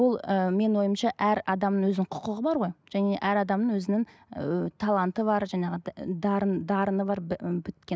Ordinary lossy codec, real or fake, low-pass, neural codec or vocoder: none; real; none; none